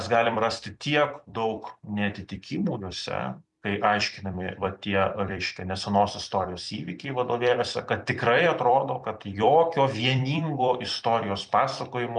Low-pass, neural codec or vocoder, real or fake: 10.8 kHz; vocoder, 44.1 kHz, 128 mel bands every 256 samples, BigVGAN v2; fake